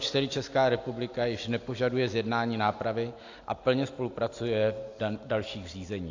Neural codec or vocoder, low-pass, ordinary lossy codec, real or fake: none; 7.2 kHz; AAC, 48 kbps; real